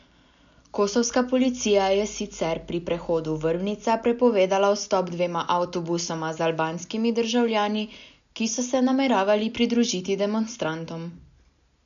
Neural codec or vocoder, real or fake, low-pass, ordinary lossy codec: none; real; 7.2 kHz; none